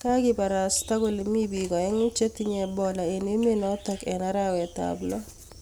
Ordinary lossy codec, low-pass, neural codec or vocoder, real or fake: none; none; none; real